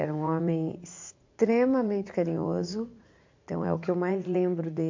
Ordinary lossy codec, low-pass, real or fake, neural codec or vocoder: MP3, 48 kbps; 7.2 kHz; fake; vocoder, 44.1 kHz, 80 mel bands, Vocos